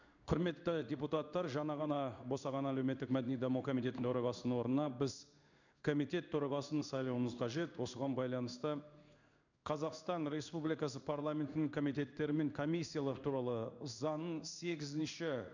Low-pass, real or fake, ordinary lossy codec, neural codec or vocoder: 7.2 kHz; fake; none; codec, 16 kHz in and 24 kHz out, 1 kbps, XY-Tokenizer